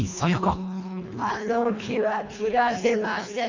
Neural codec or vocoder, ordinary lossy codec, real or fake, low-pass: codec, 24 kHz, 1.5 kbps, HILCodec; MP3, 64 kbps; fake; 7.2 kHz